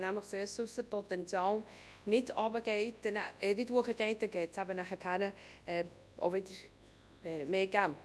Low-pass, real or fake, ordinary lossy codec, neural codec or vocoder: none; fake; none; codec, 24 kHz, 0.9 kbps, WavTokenizer, large speech release